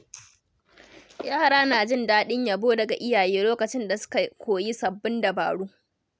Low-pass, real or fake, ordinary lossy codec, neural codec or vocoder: none; real; none; none